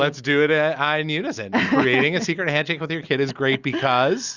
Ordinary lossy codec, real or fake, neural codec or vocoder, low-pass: Opus, 64 kbps; real; none; 7.2 kHz